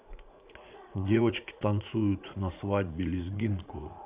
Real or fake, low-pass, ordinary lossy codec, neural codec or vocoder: fake; 3.6 kHz; Opus, 64 kbps; vocoder, 44.1 kHz, 80 mel bands, Vocos